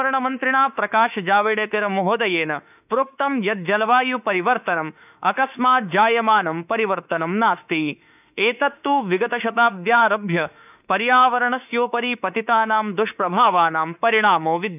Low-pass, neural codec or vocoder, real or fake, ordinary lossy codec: 3.6 kHz; autoencoder, 48 kHz, 32 numbers a frame, DAC-VAE, trained on Japanese speech; fake; none